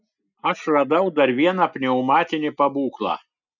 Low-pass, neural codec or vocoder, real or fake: 7.2 kHz; none; real